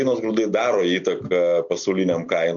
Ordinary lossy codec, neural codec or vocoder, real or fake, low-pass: MP3, 64 kbps; none; real; 7.2 kHz